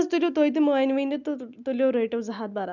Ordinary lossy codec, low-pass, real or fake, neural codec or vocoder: none; 7.2 kHz; real; none